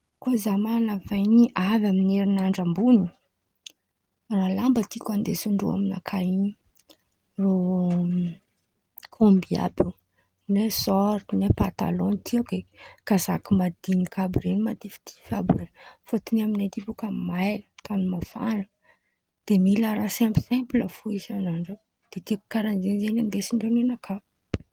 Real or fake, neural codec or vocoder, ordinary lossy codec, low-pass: real; none; Opus, 24 kbps; 19.8 kHz